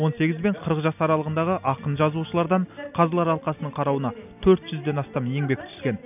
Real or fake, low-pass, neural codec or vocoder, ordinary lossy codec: real; 3.6 kHz; none; none